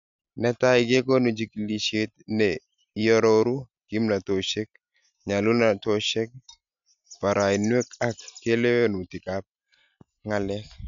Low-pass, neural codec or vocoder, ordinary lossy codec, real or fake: 7.2 kHz; none; MP3, 64 kbps; real